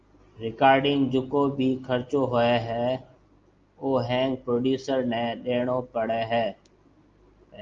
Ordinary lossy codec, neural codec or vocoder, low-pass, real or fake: Opus, 32 kbps; none; 7.2 kHz; real